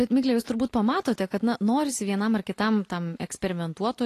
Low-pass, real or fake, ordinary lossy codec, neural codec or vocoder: 14.4 kHz; real; AAC, 48 kbps; none